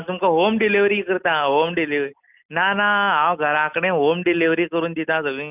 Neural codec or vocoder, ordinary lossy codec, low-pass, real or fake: none; none; 3.6 kHz; real